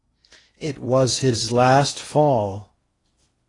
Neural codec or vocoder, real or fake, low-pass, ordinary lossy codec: codec, 16 kHz in and 24 kHz out, 0.8 kbps, FocalCodec, streaming, 65536 codes; fake; 10.8 kHz; AAC, 32 kbps